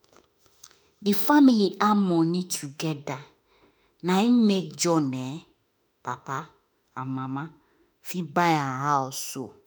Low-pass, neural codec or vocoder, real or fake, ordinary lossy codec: none; autoencoder, 48 kHz, 32 numbers a frame, DAC-VAE, trained on Japanese speech; fake; none